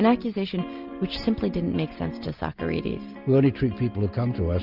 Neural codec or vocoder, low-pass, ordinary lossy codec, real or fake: none; 5.4 kHz; Opus, 32 kbps; real